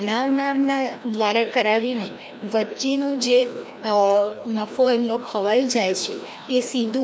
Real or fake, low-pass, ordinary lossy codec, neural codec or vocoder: fake; none; none; codec, 16 kHz, 1 kbps, FreqCodec, larger model